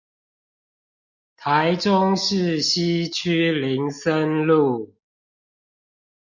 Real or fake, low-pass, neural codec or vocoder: real; 7.2 kHz; none